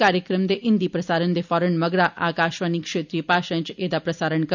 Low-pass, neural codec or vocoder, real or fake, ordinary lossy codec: 7.2 kHz; none; real; none